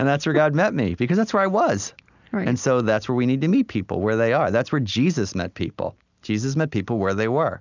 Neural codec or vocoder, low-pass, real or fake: none; 7.2 kHz; real